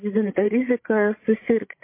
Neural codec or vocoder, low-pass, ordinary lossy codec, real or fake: codec, 16 kHz, 16 kbps, FreqCodec, larger model; 3.6 kHz; MP3, 24 kbps; fake